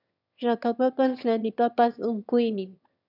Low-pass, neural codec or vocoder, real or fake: 5.4 kHz; autoencoder, 22.05 kHz, a latent of 192 numbers a frame, VITS, trained on one speaker; fake